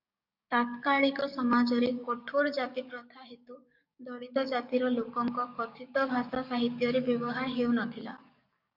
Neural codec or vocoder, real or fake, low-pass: codec, 44.1 kHz, 7.8 kbps, DAC; fake; 5.4 kHz